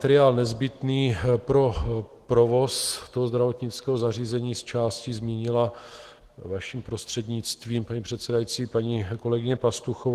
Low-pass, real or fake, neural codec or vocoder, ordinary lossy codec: 14.4 kHz; fake; autoencoder, 48 kHz, 128 numbers a frame, DAC-VAE, trained on Japanese speech; Opus, 16 kbps